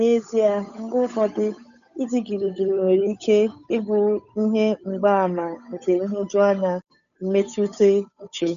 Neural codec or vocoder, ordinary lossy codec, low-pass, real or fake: codec, 16 kHz, 8 kbps, FunCodec, trained on Chinese and English, 25 frames a second; none; 7.2 kHz; fake